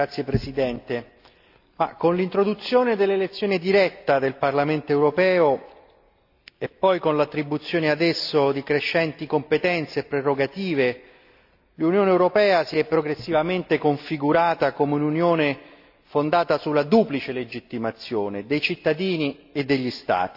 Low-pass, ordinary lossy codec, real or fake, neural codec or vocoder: 5.4 kHz; AAC, 48 kbps; real; none